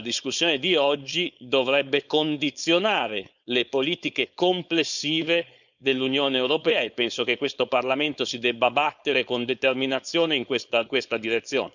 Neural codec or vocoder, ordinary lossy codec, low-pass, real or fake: codec, 16 kHz, 4.8 kbps, FACodec; none; 7.2 kHz; fake